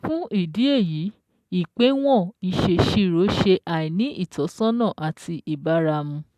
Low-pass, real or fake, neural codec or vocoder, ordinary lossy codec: 14.4 kHz; real; none; AAC, 96 kbps